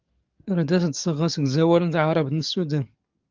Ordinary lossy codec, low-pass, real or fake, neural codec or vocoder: Opus, 24 kbps; 7.2 kHz; fake; codec, 44.1 kHz, 7.8 kbps, DAC